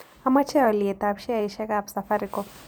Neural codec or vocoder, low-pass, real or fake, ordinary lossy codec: vocoder, 44.1 kHz, 128 mel bands every 512 samples, BigVGAN v2; none; fake; none